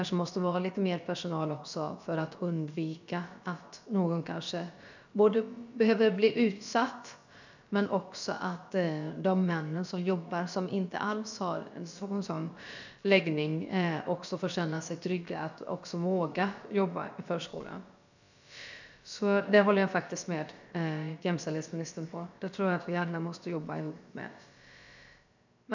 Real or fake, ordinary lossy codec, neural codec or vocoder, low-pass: fake; none; codec, 16 kHz, about 1 kbps, DyCAST, with the encoder's durations; 7.2 kHz